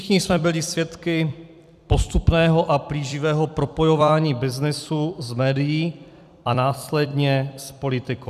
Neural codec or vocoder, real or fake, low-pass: vocoder, 44.1 kHz, 128 mel bands every 256 samples, BigVGAN v2; fake; 14.4 kHz